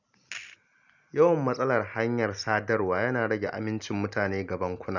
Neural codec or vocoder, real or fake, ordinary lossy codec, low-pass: none; real; none; 7.2 kHz